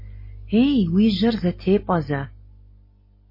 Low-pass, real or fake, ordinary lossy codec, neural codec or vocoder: 5.4 kHz; real; MP3, 24 kbps; none